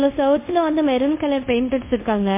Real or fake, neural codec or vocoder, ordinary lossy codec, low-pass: fake; codec, 24 kHz, 0.5 kbps, DualCodec; MP3, 24 kbps; 3.6 kHz